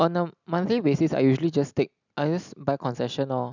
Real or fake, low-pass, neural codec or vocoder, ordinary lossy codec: real; 7.2 kHz; none; none